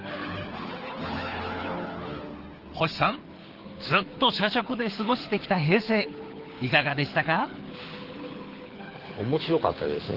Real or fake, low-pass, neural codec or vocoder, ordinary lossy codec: fake; 5.4 kHz; codec, 24 kHz, 6 kbps, HILCodec; Opus, 16 kbps